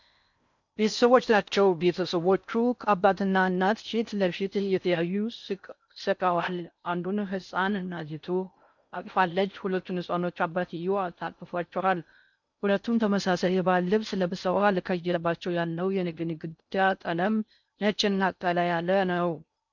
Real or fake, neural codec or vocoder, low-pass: fake; codec, 16 kHz in and 24 kHz out, 0.6 kbps, FocalCodec, streaming, 4096 codes; 7.2 kHz